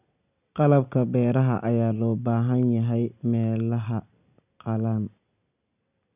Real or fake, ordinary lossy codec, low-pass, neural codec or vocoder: real; none; 3.6 kHz; none